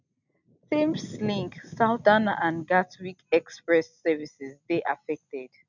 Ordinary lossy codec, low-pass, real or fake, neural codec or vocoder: none; 7.2 kHz; real; none